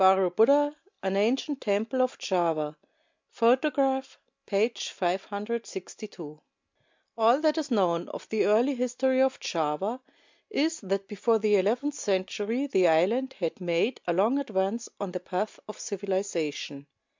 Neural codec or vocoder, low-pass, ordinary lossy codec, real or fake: none; 7.2 kHz; MP3, 64 kbps; real